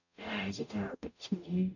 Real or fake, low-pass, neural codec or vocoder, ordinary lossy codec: fake; 7.2 kHz; codec, 44.1 kHz, 0.9 kbps, DAC; none